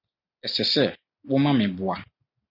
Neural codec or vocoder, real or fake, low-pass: none; real; 5.4 kHz